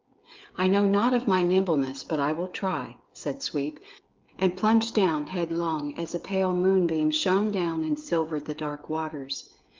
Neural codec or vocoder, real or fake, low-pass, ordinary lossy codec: codec, 16 kHz, 8 kbps, FreqCodec, smaller model; fake; 7.2 kHz; Opus, 24 kbps